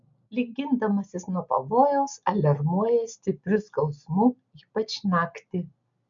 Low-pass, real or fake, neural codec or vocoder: 7.2 kHz; real; none